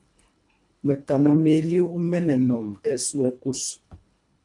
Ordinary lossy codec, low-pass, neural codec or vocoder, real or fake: MP3, 64 kbps; 10.8 kHz; codec, 24 kHz, 1.5 kbps, HILCodec; fake